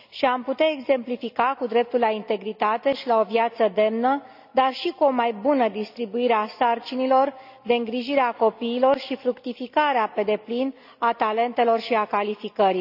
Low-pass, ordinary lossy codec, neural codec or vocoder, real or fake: 5.4 kHz; none; none; real